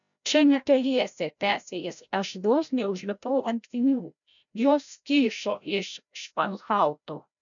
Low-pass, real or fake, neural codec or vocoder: 7.2 kHz; fake; codec, 16 kHz, 0.5 kbps, FreqCodec, larger model